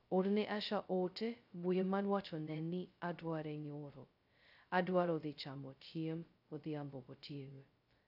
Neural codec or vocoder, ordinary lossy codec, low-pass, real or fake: codec, 16 kHz, 0.2 kbps, FocalCodec; AAC, 48 kbps; 5.4 kHz; fake